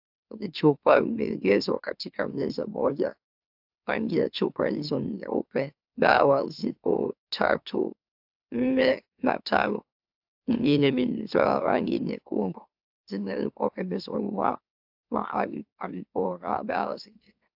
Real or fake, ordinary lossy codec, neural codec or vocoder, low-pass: fake; AAC, 48 kbps; autoencoder, 44.1 kHz, a latent of 192 numbers a frame, MeloTTS; 5.4 kHz